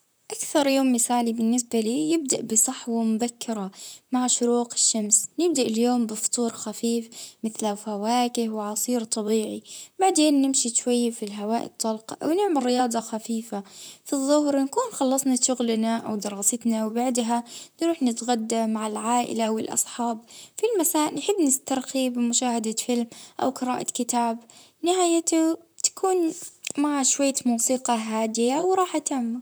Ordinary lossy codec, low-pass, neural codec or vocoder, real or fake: none; none; vocoder, 44.1 kHz, 128 mel bands, Pupu-Vocoder; fake